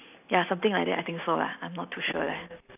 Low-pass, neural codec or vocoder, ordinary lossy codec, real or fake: 3.6 kHz; none; none; real